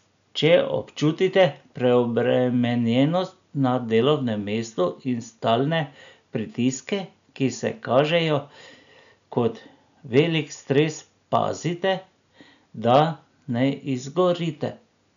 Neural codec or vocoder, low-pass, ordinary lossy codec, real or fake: none; 7.2 kHz; none; real